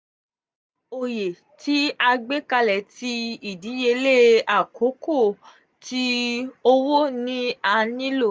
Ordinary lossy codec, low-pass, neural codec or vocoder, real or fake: none; none; none; real